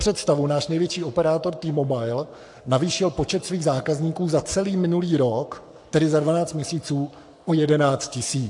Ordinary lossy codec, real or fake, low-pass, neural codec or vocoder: AAC, 64 kbps; fake; 10.8 kHz; codec, 44.1 kHz, 7.8 kbps, Pupu-Codec